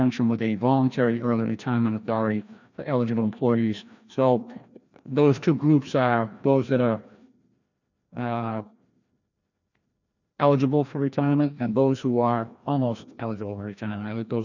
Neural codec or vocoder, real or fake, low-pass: codec, 16 kHz, 1 kbps, FreqCodec, larger model; fake; 7.2 kHz